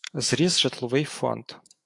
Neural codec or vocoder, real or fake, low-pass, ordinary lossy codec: autoencoder, 48 kHz, 128 numbers a frame, DAC-VAE, trained on Japanese speech; fake; 10.8 kHz; AAC, 64 kbps